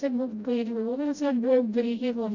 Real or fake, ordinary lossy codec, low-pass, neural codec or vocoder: fake; none; 7.2 kHz; codec, 16 kHz, 0.5 kbps, FreqCodec, smaller model